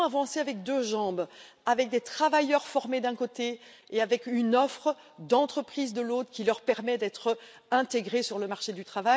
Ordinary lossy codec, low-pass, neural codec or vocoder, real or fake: none; none; none; real